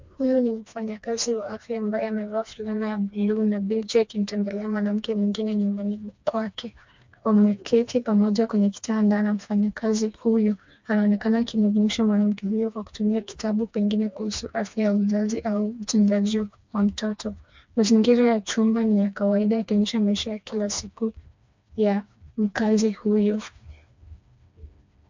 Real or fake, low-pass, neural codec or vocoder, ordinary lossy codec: fake; 7.2 kHz; codec, 16 kHz, 2 kbps, FreqCodec, smaller model; MP3, 64 kbps